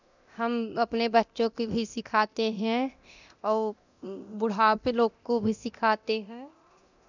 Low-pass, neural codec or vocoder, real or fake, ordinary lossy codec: 7.2 kHz; codec, 24 kHz, 0.9 kbps, DualCodec; fake; none